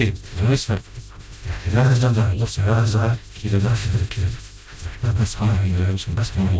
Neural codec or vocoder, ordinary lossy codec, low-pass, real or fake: codec, 16 kHz, 0.5 kbps, FreqCodec, smaller model; none; none; fake